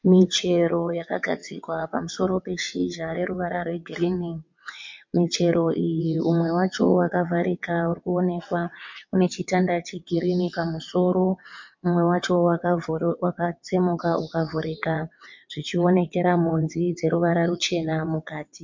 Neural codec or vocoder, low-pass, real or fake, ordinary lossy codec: vocoder, 22.05 kHz, 80 mel bands, WaveNeXt; 7.2 kHz; fake; MP3, 48 kbps